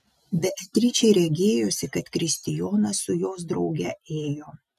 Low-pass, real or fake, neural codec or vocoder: 14.4 kHz; real; none